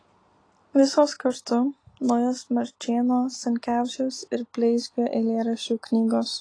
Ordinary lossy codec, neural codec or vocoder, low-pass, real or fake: AAC, 32 kbps; none; 9.9 kHz; real